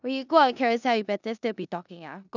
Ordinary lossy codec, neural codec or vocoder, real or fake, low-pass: none; codec, 16 kHz in and 24 kHz out, 0.9 kbps, LongCat-Audio-Codec, four codebook decoder; fake; 7.2 kHz